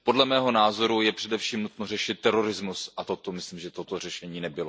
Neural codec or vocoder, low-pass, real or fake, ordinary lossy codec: none; none; real; none